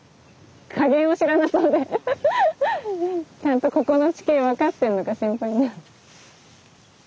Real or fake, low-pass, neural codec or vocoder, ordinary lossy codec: real; none; none; none